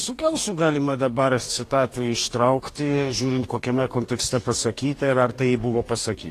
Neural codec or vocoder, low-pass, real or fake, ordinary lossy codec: codec, 44.1 kHz, 2.6 kbps, DAC; 14.4 kHz; fake; AAC, 48 kbps